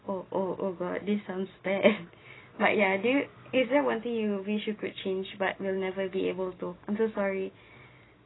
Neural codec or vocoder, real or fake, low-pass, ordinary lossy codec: none; real; 7.2 kHz; AAC, 16 kbps